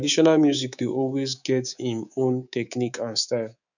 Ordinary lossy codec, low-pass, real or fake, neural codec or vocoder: none; 7.2 kHz; fake; codec, 24 kHz, 3.1 kbps, DualCodec